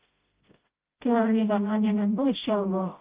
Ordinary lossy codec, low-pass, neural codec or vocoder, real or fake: Opus, 32 kbps; 3.6 kHz; codec, 16 kHz, 0.5 kbps, FreqCodec, smaller model; fake